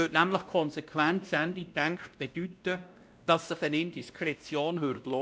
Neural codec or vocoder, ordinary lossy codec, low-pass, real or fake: codec, 16 kHz, 1 kbps, X-Codec, WavLM features, trained on Multilingual LibriSpeech; none; none; fake